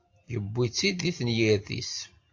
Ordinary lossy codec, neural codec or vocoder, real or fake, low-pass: AAC, 48 kbps; none; real; 7.2 kHz